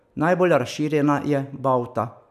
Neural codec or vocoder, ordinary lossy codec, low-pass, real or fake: none; none; 14.4 kHz; real